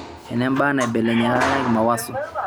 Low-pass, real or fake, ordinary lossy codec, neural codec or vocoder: none; real; none; none